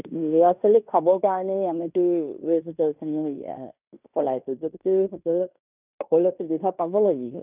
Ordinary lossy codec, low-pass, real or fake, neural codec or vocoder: none; 3.6 kHz; fake; codec, 16 kHz in and 24 kHz out, 0.9 kbps, LongCat-Audio-Codec, fine tuned four codebook decoder